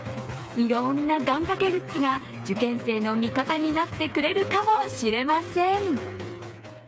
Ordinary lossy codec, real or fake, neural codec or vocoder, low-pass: none; fake; codec, 16 kHz, 4 kbps, FreqCodec, smaller model; none